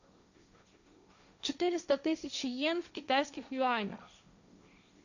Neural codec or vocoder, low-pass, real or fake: codec, 16 kHz, 1.1 kbps, Voila-Tokenizer; 7.2 kHz; fake